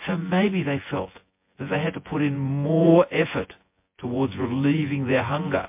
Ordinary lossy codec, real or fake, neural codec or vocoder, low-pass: MP3, 24 kbps; fake; vocoder, 24 kHz, 100 mel bands, Vocos; 3.6 kHz